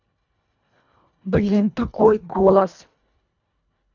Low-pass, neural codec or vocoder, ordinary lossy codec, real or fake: 7.2 kHz; codec, 24 kHz, 1.5 kbps, HILCodec; none; fake